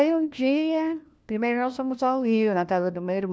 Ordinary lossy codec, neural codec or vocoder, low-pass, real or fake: none; codec, 16 kHz, 1 kbps, FunCodec, trained on LibriTTS, 50 frames a second; none; fake